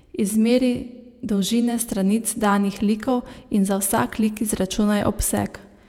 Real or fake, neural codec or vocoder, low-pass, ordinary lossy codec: fake; vocoder, 48 kHz, 128 mel bands, Vocos; 19.8 kHz; none